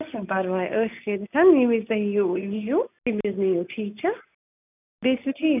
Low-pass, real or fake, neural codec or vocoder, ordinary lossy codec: 3.6 kHz; real; none; none